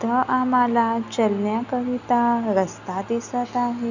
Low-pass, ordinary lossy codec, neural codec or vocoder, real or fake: 7.2 kHz; none; none; real